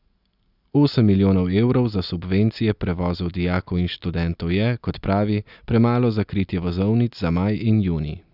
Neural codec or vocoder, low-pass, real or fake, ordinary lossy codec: none; 5.4 kHz; real; none